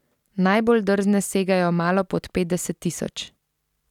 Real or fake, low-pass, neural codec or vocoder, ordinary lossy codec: real; 19.8 kHz; none; none